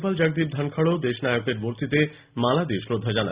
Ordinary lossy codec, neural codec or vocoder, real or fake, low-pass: Opus, 64 kbps; none; real; 3.6 kHz